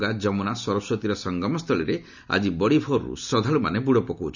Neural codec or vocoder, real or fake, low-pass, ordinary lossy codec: none; real; 7.2 kHz; none